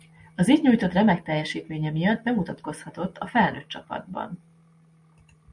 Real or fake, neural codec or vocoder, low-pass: real; none; 10.8 kHz